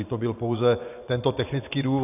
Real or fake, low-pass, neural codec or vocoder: fake; 3.6 kHz; vocoder, 24 kHz, 100 mel bands, Vocos